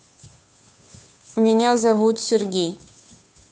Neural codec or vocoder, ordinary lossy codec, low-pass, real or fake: codec, 16 kHz, 2 kbps, FunCodec, trained on Chinese and English, 25 frames a second; none; none; fake